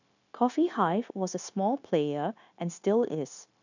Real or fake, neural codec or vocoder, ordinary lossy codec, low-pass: fake; codec, 16 kHz, 0.9 kbps, LongCat-Audio-Codec; none; 7.2 kHz